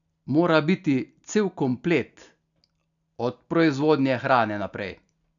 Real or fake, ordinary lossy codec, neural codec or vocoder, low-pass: real; none; none; 7.2 kHz